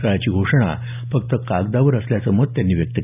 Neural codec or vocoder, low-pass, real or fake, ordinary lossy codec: none; 3.6 kHz; real; none